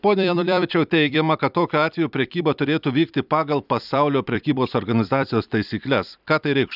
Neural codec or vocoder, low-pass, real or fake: vocoder, 44.1 kHz, 128 mel bands every 256 samples, BigVGAN v2; 5.4 kHz; fake